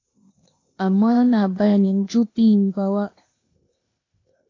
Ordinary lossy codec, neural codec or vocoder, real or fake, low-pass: AAC, 32 kbps; codec, 16 kHz, 0.8 kbps, ZipCodec; fake; 7.2 kHz